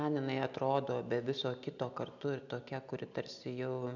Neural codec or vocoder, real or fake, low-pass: none; real; 7.2 kHz